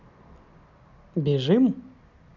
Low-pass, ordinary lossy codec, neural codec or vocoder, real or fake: 7.2 kHz; none; none; real